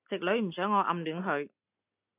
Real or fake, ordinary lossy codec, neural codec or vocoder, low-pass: real; AAC, 24 kbps; none; 3.6 kHz